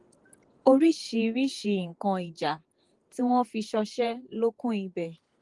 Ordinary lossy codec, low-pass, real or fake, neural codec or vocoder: Opus, 24 kbps; 10.8 kHz; fake; vocoder, 48 kHz, 128 mel bands, Vocos